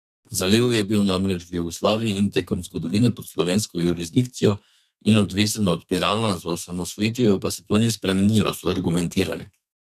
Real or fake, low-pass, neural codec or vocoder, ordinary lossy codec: fake; 14.4 kHz; codec, 32 kHz, 1.9 kbps, SNAC; none